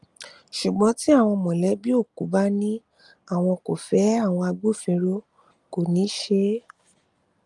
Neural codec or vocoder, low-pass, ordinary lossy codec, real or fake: none; 10.8 kHz; Opus, 32 kbps; real